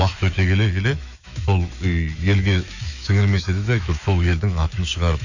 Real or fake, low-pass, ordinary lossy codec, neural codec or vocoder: fake; 7.2 kHz; none; autoencoder, 48 kHz, 128 numbers a frame, DAC-VAE, trained on Japanese speech